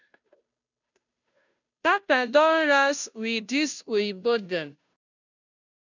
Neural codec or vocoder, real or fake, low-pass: codec, 16 kHz, 0.5 kbps, FunCodec, trained on Chinese and English, 25 frames a second; fake; 7.2 kHz